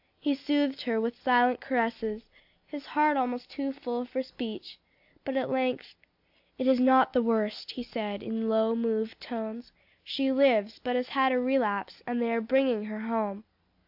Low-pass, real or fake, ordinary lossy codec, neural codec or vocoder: 5.4 kHz; real; AAC, 48 kbps; none